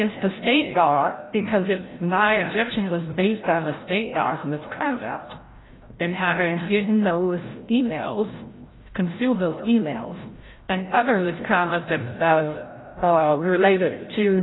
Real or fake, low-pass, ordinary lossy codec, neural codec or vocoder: fake; 7.2 kHz; AAC, 16 kbps; codec, 16 kHz, 0.5 kbps, FreqCodec, larger model